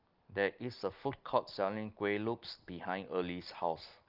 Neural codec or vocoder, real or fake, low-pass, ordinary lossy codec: none; real; 5.4 kHz; Opus, 24 kbps